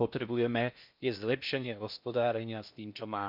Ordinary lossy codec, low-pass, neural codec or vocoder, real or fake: none; 5.4 kHz; codec, 16 kHz in and 24 kHz out, 0.6 kbps, FocalCodec, streaming, 4096 codes; fake